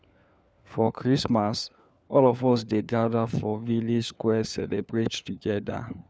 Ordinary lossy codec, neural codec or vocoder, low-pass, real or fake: none; codec, 16 kHz, 4 kbps, FunCodec, trained on LibriTTS, 50 frames a second; none; fake